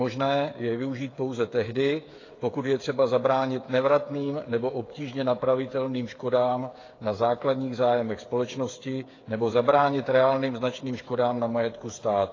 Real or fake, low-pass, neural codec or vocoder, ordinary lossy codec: fake; 7.2 kHz; codec, 16 kHz, 8 kbps, FreqCodec, smaller model; AAC, 32 kbps